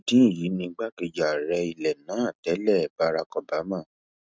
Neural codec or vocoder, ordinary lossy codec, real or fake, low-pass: none; none; real; none